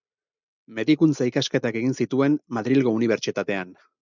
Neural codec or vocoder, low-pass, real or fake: none; 7.2 kHz; real